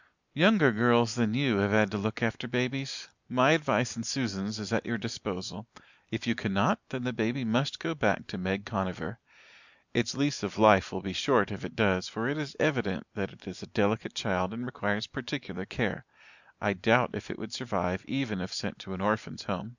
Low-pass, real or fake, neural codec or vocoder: 7.2 kHz; real; none